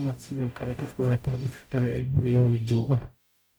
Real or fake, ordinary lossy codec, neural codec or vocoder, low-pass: fake; none; codec, 44.1 kHz, 0.9 kbps, DAC; none